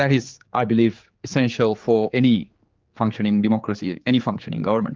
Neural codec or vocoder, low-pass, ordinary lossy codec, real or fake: codec, 16 kHz, 4 kbps, X-Codec, HuBERT features, trained on general audio; 7.2 kHz; Opus, 32 kbps; fake